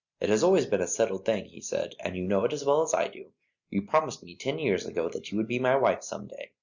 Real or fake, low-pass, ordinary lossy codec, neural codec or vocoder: real; 7.2 kHz; Opus, 64 kbps; none